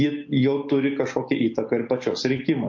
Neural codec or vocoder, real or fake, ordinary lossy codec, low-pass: none; real; MP3, 48 kbps; 7.2 kHz